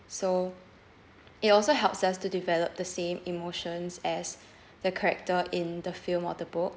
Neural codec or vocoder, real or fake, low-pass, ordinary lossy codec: none; real; none; none